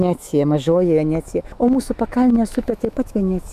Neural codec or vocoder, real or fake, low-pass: autoencoder, 48 kHz, 128 numbers a frame, DAC-VAE, trained on Japanese speech; fake; 14.4 kHz